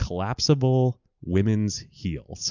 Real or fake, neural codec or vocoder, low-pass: real; none; 7.2 kHz